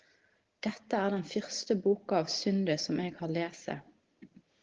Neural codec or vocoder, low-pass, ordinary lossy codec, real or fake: none; 7.2 kHz; Opus, 16 kbps; real